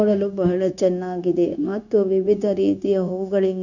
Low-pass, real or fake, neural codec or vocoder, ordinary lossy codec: 7.2 kHz; fake; codec, 16 kHz, 0.9 kbps, LongCat-Audio-Codec; none